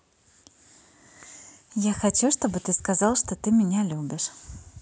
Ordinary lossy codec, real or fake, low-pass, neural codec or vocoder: none; real; none; none